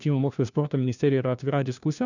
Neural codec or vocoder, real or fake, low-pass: codec, 16 kHz, 1 kbps, FunCodec, trained on LibriTTS, 50 frames a second; fake; 7.2 kHz